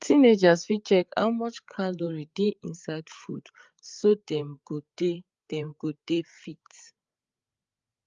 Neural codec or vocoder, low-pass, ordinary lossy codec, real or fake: codec, 16 kHz, 8 kbps, FreqCodec, larger model; 7.2 kHz; Opus, 32 kbps; fake